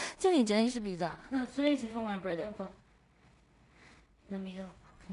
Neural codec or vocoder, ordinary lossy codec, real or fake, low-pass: codec, 16 kHz in and 24 kHz out, 0.4 kbps, LongCat-Audio-Codec, two codebook decoder; none; fake; 10.8 kHz